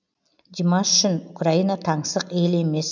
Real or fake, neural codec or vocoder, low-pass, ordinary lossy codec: real; none; 7.2 kHz; none